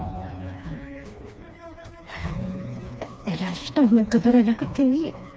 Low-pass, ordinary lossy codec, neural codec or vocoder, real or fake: none; none; codec, 16 kHz, 2 kbps, FreqCodec, smaller model; fake